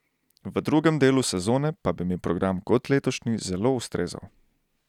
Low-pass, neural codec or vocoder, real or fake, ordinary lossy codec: 19.8 kHz; none; real; none